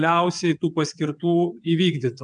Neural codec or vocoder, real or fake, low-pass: vocoder, 22.05 kHz, 80 mel bands, WaveNeXt; fake; 9.9 kHz